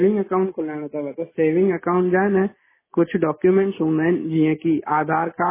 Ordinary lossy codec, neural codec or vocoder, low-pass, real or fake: MP3, 16 kbps; none; 3.6 kHz; real